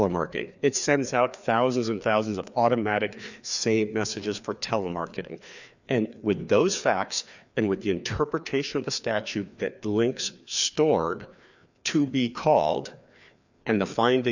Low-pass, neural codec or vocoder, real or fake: 7.2 kHz; codec, 16 kHz, 2 kbps, FreqCodec, larger model; fake